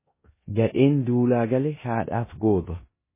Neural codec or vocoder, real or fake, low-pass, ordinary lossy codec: codec, 16 kHz in and 24 kHz out, 0.9 kbps, LongCat-Audio-Codec, four codebook decoder; fake; 3.6 kHz; MP3, 16 kbps